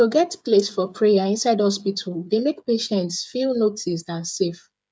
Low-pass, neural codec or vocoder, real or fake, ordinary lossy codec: none; codec, 16 kHz, 8 kbps, FreqCodec, smaller model; fake; none